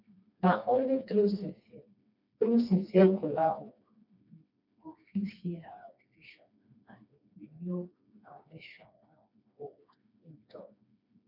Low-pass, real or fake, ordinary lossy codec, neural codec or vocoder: 5.4 kHz; fake; none; codec, 16 kHz, 2 kbps, FreqCodec, smaller model